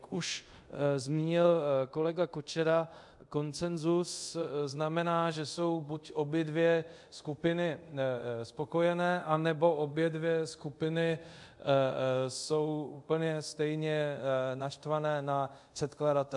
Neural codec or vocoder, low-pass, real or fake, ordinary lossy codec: codec, 24 kHz, 0.5 kbps, DualCodec; 10.8 kHz; fake; MP3, 64 kbps